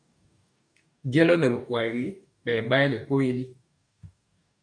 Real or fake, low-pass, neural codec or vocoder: fake; 9.9 kHz; codec, 44.1 kHz, 2.6 kbps, DAC